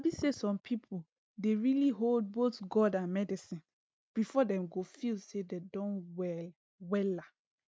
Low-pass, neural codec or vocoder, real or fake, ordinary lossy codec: none; none; real; none